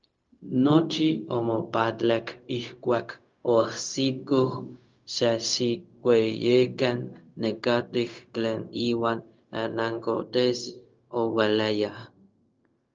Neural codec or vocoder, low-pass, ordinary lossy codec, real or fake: codec, 16 kHz, 0.4 kbps, LongCat-Audio-Codec; 7.2 kHz; Opus, 24 kbps; fake